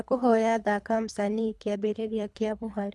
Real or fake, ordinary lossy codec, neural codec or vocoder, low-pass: fake; none; codec, 24 kHz, 3 kbps, HILCodec; none